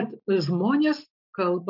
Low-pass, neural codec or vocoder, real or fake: 5.4 kHz; none; real